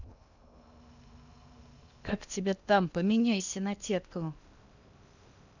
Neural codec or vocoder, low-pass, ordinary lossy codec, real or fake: codec, 16 kHz in and 24 kHz out, 0.8 kbps, FocalCodec, streaming, 65536 codes; 7.2 kHz; none; fake